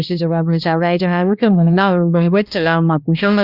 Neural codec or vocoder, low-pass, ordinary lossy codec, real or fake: codec, 16 kHz, 1 kbps, X-Codec, HuBERT features, trained on balanced general audio; 5.4 kHz; Opus, 64 kbps; fake